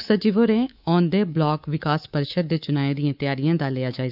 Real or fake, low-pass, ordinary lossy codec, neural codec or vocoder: fake; 5.4 kHz; none; codec, 24 kHz, 3.1 kbps, DualCodec